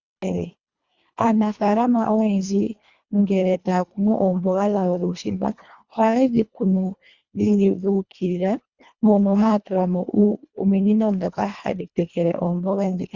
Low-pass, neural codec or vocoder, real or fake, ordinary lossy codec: 7.2 kHz; codec, 24 kHz, 1.5 kbps, HILCodec; fake; Opus, 64 kbps